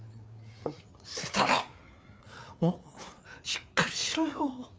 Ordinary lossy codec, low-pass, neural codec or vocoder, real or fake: none; none; codec, 16 kHz, 4 kbps, FreqCodec, larger model; fake